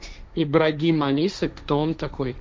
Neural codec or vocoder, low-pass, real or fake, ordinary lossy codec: codec, 16 kHz, 1.1 kbps, Voila-Tokenizer; none; fake; none